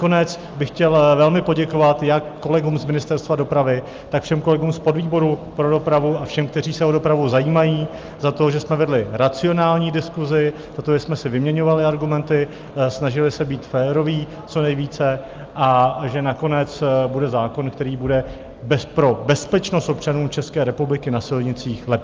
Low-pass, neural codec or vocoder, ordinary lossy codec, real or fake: 7.2 kHz; none; Opus, 24 kbps; real